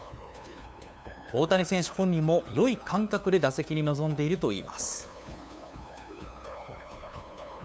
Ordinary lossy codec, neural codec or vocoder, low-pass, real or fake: none; codec, 16 kHz, 2 kbps, FunCodec, trained on LibriTTS, 25 frames a second; none; fake